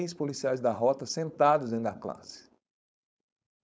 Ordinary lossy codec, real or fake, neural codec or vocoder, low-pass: none; fake; codec, 16 kHz, 4.8 kbps, FACodec; none